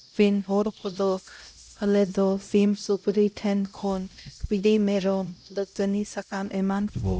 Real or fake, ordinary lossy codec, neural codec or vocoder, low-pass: fake; none; codec, 16 kHz, 0.5 kbps, X-Codec, HuBERT features, trained on LibriSpeech; none